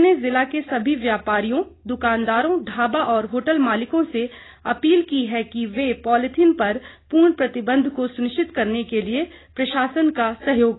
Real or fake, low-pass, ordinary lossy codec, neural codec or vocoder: real; 7.2 kHz; AAC, 16 kbps; none